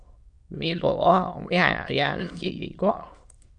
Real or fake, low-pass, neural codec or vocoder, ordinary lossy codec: fake; 9.9 kHz; autoencoder, 22.05 kHz, a latent of 192 numbers a frame, VITS, trained on many speakers; MP3, 64 kbps